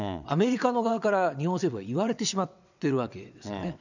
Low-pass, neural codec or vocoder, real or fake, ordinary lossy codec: 7.2 kHz; vocoder, 22.05 kHz, 80 mel bands, WaveNeXt; fake; none